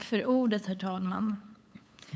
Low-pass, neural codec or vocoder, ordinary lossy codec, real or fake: none; codec, 16 kHz, 8 kbps, FunCodec, trained on LibriTTS, 25 frames a second; none; fake